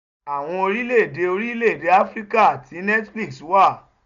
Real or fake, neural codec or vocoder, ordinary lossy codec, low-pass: real; none; none; 7.2 kHz